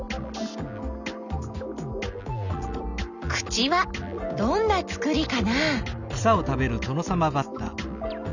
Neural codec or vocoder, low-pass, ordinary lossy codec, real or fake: none; 7.2 kHz; none; real